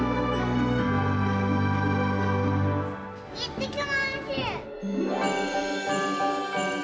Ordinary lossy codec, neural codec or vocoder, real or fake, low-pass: none; none; real; none